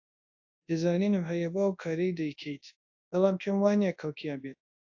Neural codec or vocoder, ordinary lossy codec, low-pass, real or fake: codec, 24 kHz, 0.9 kbps, WavTokenizer, large speech release; AAC, 48 kbps; 7.2 kHz; fake